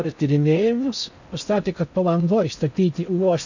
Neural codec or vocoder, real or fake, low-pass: codec, 16 kHz in and 24 kHz out, 0.6 kbps, FocalCodec, streaming, 4096 codes; fake; 7.2 kHz